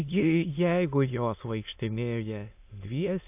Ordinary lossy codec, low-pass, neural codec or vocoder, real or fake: AAC, 32 kbps; 3.6 kHz; autoencoder, 22.05 kHz, a latent of 192 numbers a frame, VITS, trained on many speakers; fake